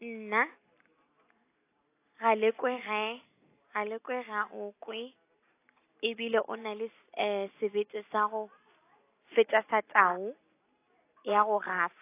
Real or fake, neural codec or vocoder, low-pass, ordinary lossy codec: real; none; 3.6 kHz; AAC, 24 kbps